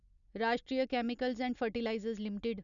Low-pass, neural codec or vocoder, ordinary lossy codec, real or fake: 7.2 kHz; none; none; real